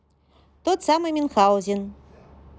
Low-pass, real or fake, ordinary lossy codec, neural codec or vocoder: none; real; none; none